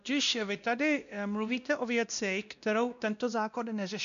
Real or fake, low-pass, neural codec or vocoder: fake; 7.2 kHz; codec, 16 kHz, 1 kbps, X-Codec, WavLM features, trained on Multilingual LibriSpeech